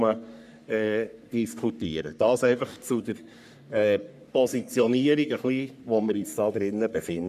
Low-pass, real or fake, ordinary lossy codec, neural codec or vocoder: 14.4 kHz; fake; none; codec, 44.1 kHz, 3.4 kbps, Pupu-Codec